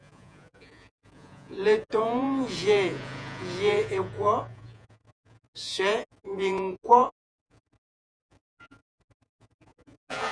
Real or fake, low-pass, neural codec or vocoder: fake; 9.9 kHz; vocoder, 48 kHz, 128 mel bands, Vocos